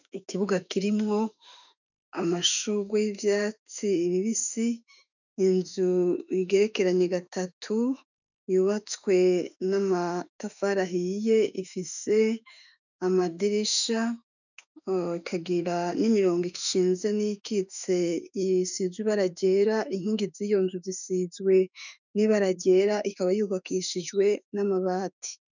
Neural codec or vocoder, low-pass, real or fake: autoencoder, 48 kHz, 32 numbers a frame, DAC-VAE, trained on Japanese speech; 7.2 kHz; fake